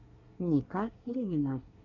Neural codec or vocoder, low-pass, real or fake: codec, 24 kHz, 1 kbps, SNAC; 7.2 kHz; fake